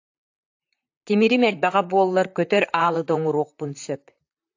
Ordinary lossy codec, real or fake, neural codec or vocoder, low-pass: AAC, 48 kbps; fake; vocoder, 44.1 kHz, 128 mel bands, Pupu-Vocoder; 7.2 kHz